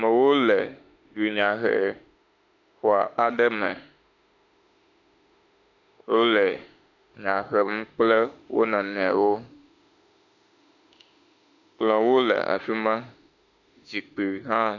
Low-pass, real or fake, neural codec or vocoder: 7.2 kHz; fake; autoencoder, 48 kHz, 32 numbers a frame, DAC-VAE, trained on Japanese speech